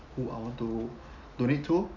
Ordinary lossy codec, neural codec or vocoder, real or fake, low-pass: none; none; real; 7.2 kHz